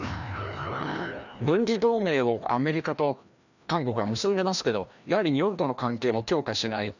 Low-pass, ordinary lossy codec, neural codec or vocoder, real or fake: 7.2 kHz; none; codec, 16 kHz, 1 kbps, FreqCodec, larger model; fake